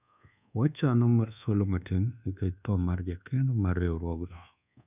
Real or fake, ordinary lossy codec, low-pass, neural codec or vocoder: fake; none; 3.6 kHz; codec, 24 kHz, 1.2 kbps, DualCodec